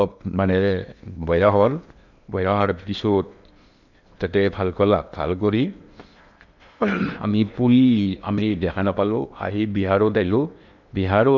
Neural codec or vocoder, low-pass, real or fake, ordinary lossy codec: codec, 16 kHz in and 24 kHz out, 0.8 kbps, FocalCodec, streaming, 65536 codes; 7.2 kHz; fake; none